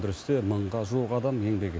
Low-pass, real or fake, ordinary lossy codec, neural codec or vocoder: none; real; none; none